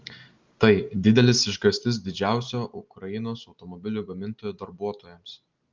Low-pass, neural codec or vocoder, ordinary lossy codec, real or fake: 7.2 kHz; none; Opus, 32 kbps; real